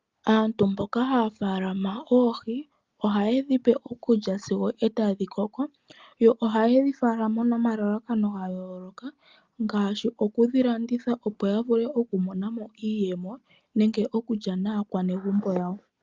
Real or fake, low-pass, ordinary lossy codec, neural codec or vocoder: real; 7.2 kHz; Opus, 24 kbps; none